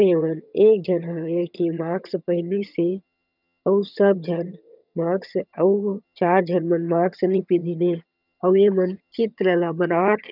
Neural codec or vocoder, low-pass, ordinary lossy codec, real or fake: vocoder, 22.05 kHz, 80 mel bands, HiFi-GAN; 5.4 kHz; none; fake